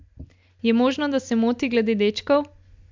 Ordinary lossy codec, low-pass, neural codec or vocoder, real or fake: MP3, 64 kbps; 7.2 kHz; none; real